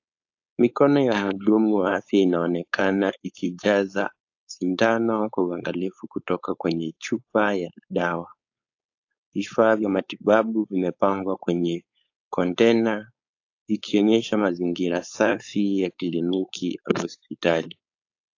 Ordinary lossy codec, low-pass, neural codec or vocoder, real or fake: AAC, 48 kbps; 7.2 kHz; codec, 16 kHz, 4.8 kbps, FACodec; fake